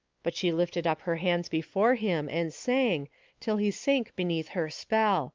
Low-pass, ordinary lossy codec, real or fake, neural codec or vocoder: 7.2 kHz; Opus, 32 kbps; fake; codec, 16 kHz, 4 kbps, X-Codec, WavLM features, trained on Multilingual LibriSpeech